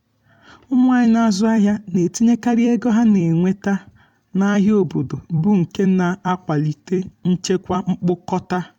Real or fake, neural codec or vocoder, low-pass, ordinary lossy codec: fake; vocoder, 44.1 kHz, 128 mel bands every 256 samples, BigVGAN v2; 19.8 kHz; MP3, 96 kbps